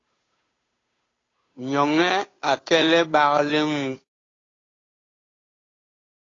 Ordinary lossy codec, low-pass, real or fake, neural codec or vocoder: AAC, 32 kbps; 7.2 kHz; fake; codec, 16 kHz, 2 kbps, FunCodec, trained on Chinese and English, 25 frames a second